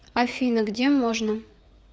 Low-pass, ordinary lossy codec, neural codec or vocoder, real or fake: none; none; codec, 16 kHz, 4 kbps, FreqCodec, larger model; fake